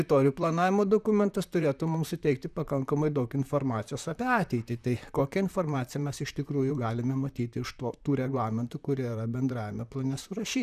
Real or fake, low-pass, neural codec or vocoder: fake; 14.4 kHz; vocoder, 44.1 kHz, 128 mel bands, Pupu-Vocoder